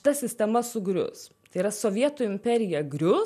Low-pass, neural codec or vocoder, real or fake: 14.4 kHz; none; real